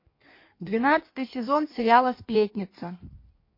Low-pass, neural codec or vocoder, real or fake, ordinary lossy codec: 5.4 kHz; codec, 16 kHz in and 24 kHz out, 1.1 kbps, FireRedTTS-2 codec; fake; MP3, 32 kbps